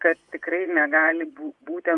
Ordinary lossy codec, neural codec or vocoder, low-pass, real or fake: Opus, 64 kbps; vocoder, 24 kHz, 100 mel bands, Vocos; 10.8 kHz; fake